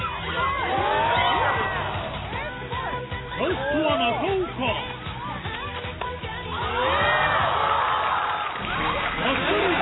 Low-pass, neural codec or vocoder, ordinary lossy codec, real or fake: 7.2 kHz; none; AAC, 16 kbps; real